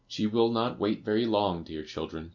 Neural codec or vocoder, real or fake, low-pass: none; real; 7.2 kHz